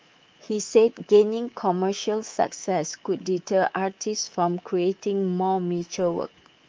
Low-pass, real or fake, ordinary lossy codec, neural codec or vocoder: 7.2 kHz; fake; Opus, 24 kbps; codec, 24 kHz, 3.1 kbps, DualCodec